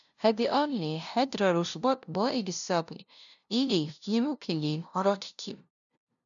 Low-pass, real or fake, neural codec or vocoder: 7.2 kHz; fake; codec, 16 kHz, 0.5 kbps, FunCodec, trained on LibriTTS, 25 frames a second